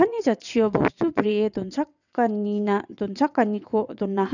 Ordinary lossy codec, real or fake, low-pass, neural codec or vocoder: none; fake; 7.2 kHz; vocoder, 22.05 kHz, 80 mel bands, WaveNeXt